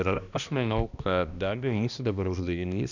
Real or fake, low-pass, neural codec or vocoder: fake; 7.2 kHz; codec, 16 kHz, 1 kbps, X-Codec, HuBERT features, trained on balanced general audio